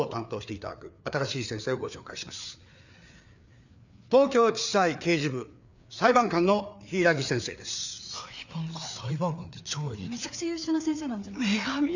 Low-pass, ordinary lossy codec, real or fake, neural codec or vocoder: 7.2 kHz; none; fake; codec, 16 kHz, 4 kbps, FreqCodec, larger model